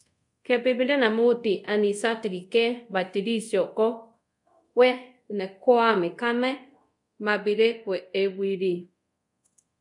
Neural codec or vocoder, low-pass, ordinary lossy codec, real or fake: codec, 24 kHz, 0.5 kbps, DualCodec; 10.8 kHz; MP3, 64 kbps; fake